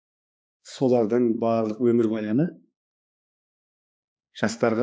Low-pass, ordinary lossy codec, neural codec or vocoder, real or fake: none; none; codec, 16 kHz, 2 kbps, X-Codec, HuBERT features, trained on balanced general audio; fake